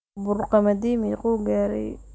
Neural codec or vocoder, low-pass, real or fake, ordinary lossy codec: none; none; real; none